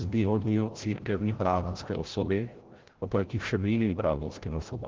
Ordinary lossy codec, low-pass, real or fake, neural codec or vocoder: Opus, 16 kbps; 7.2 kHz; fake; codec, 16 kHz, 0.5 kbps, FreqCodec, larger model